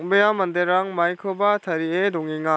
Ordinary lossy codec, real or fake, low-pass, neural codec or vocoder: none; real; none; none